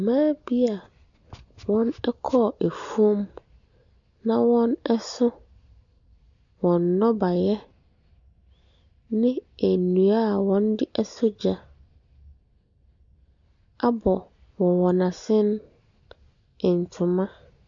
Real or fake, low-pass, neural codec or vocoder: real; 7.2 kHz; none